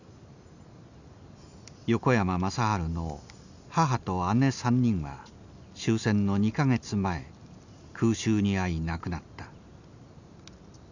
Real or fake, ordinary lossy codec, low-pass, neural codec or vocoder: real; none; 7.2 kHz; none